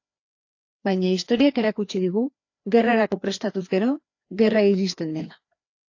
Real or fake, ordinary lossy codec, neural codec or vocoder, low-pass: fake; AAC, 48 kbps; codec, 16 kHz, 2 kbps, FreqCodec, larger model; 7.2 kHz